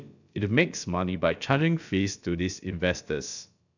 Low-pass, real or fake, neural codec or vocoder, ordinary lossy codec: 7.2 kHz; fake; codec, 16 kHz, about 1 kbps, DyCAST, with the encoder's durations; none